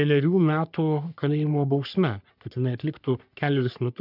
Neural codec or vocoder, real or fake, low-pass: codec, 44.1 kHz, 3.4 kbps, Pupu-Codec; fake; 5.4 kHz